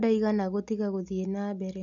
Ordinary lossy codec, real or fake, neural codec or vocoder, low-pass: none; real; none; 7.2 kHz